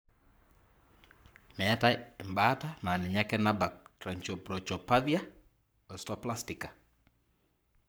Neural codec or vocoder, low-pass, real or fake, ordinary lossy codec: codec, 44.1 kHz, 7.8 kbps, Pupu-Codec; none; fake; none